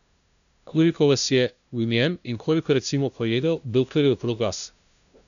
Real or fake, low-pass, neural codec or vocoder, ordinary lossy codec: fake; 7.2 kHz; codec, 16 kHz, 0.5 kbps, FunCodec, trained on LibriTTS, 25 frames a second; none